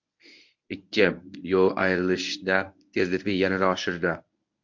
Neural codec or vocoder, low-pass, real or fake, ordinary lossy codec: codec, 24 kHz, 0.9 kbps, WavTokenizer, medium speech release version 1; 7.2 kHz; fake; MP3, 48 kbps